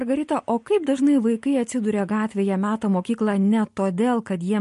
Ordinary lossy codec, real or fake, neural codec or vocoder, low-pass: MP3, 48 kbps; real; none; 14.4 kHz